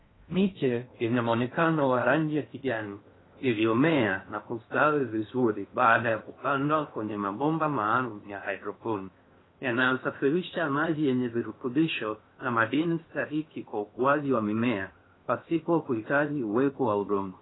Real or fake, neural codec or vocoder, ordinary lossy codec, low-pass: fake; codec, 16 kHz in and 24 kHz out, 0.6 kbps, FocalCodec, streaming, 4096 codes; AAC, 16 kbps; 7.2 kHz